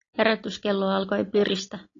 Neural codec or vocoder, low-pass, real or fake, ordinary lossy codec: none; 7.2 kHz; real; AAC, 32 kbps